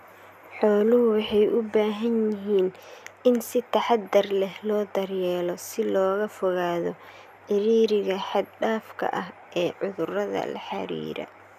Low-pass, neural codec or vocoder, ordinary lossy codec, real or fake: 14.4 kHz; none; none; real